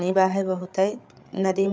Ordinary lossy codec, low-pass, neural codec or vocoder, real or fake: none; none; codec, 16 kHz, 8 kbps, FreqCodec, larger model; fake